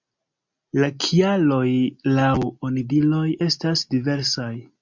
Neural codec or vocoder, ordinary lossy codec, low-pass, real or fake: none; MP3, 64 kbps; 7.2 kHz; real